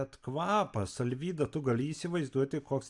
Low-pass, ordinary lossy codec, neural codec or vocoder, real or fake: 10.8 kHz; AAC, 64 kbps; none; real